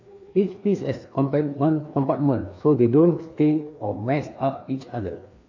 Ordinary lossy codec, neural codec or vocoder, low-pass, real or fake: AAC, 48 kbps; codec, 16 kHz, 2 kbps, FreqCodec, larger model; 7.2 kHz; fake